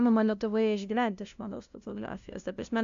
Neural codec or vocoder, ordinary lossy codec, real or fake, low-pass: codec, 16 kHz, 0.5 kbps, FunCodec, trained on LibriTTS, 25 frames a second; AAC, 64 kbps; fake; 7.2 kHz